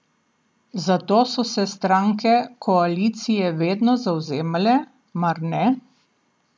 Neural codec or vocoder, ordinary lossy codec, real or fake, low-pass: none; none; real; none